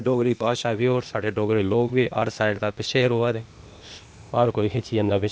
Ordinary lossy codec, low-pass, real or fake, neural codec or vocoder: none; none; fake; codec, 16 kHz, 0.8 kbps, ZipCodec